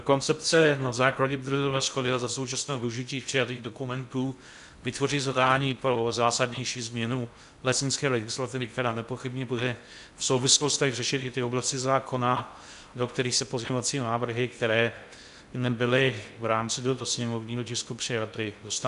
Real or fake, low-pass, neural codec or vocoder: fake; 10.8 kHz; codec, 16 kHz in and 24 kHz out, 0.6 kbps, FocalCodec, streaming, 2048 codes